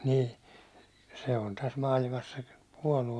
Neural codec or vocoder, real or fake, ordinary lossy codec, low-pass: none; real; none; none